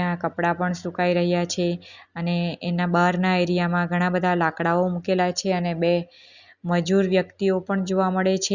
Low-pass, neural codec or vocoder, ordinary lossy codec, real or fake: 7.2 kHz; none; Opus, 64 kbps; real